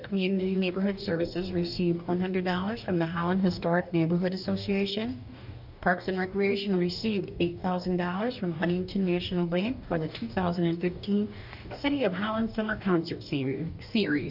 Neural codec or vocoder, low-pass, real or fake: codec, 44.1 kHz, 2.6 kbps, DAC; 5.4 kHz; fake